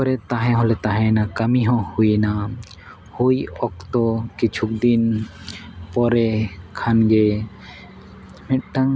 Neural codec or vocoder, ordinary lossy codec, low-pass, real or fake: none; none; none; real